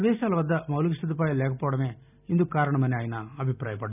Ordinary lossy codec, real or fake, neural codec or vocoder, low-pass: none; real; none; 3.6 kHz